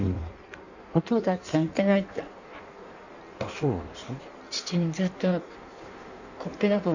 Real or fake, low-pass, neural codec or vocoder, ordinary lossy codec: fake; 7.2 kHz; codec, 16 kHz in and 24 kHz out, 1.1 kbps, FireRedTTS-2 codec; none